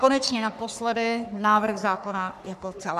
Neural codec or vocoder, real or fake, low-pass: codec, 44.1 kHz, 3.4 kbps, Pupu-Codec; fake; 14.4 kHz